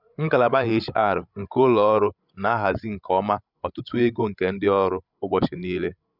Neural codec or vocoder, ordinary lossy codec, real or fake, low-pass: codec, 16 kHz, 16 kbps, FreqCodec, larger model; none; fake; 5.4 kHz